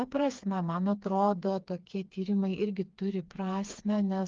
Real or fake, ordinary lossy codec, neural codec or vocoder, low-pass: fake; Opus, 32 kbps; codec, 16 kHz, 4 kbps, FreqCodec, smaller model; 7.2 kHz